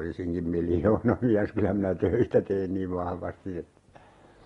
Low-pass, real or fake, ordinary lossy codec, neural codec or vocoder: 10.8 kHz; real; AAC, 32 kbps; none